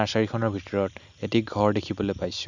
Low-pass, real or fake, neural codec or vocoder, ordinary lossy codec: 7.2 kHz; real; none; none